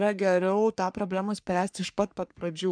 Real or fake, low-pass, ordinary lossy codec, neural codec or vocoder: fake; 9.9 kHz; MP3, 96 kbps; codec, 44.1 kHz, 3.4 kbps, Pupu-Codec